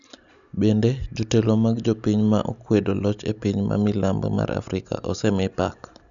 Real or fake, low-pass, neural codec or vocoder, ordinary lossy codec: real; 7.2 kHz; none; none